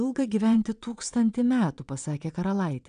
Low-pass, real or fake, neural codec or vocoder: 9.9 kHz; fake; vocoder, 22.05 kHz, 80 mel bands, WaveNeXt